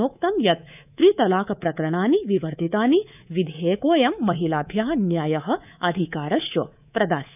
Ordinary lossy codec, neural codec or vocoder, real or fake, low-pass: none; codec, 24 kHz, 3.1 kbps, DualCodec; fake; 3.6 kHz